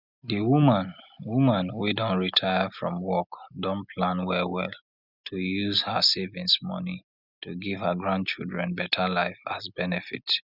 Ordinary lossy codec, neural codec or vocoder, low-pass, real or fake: none; none; 5.4 kHz; real